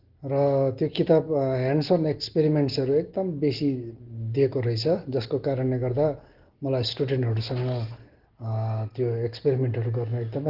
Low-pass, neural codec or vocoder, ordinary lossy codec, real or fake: 5.4 kHz; none; Opus, 16 kbps; real